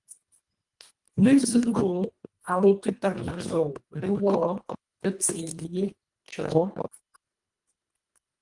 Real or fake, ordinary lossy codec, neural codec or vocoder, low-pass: fake; Opus, 32 kbps; codec, 24 kHz, 1.5 kbps, HILCodec; 10.8 kHz